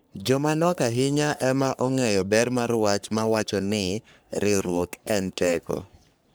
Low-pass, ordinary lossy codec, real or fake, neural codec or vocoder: none; none; fake; codec, 44.1 kHz, 3.4 kbps, Pupu-Codec